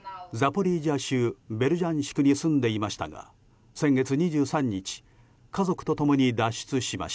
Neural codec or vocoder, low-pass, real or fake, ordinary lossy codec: none; none; real; none